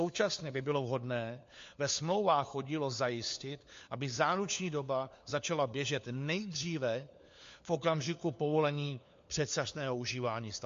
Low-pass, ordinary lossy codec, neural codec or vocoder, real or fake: 7.2 kHz; MP3, 48 kbps; codec, 16 kHz, 4 kbps, FunCodec, trained on LibriTTS, 50 frames a second; fake